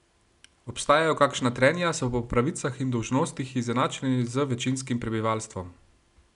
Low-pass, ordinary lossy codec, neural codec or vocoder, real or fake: 10.8 kHz; none; none; real